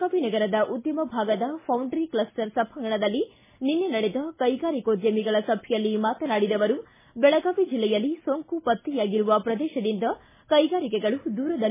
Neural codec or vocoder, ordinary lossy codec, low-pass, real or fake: none; MP3, 16 kbps; 3.6 kHz; real